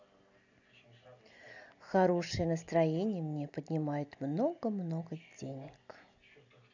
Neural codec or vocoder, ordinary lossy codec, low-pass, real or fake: none; none; 7.2 kHz; real